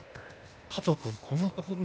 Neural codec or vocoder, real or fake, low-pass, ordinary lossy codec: codec, 16 kHz, 0.8 kbps, ZipCodec; fake; none; none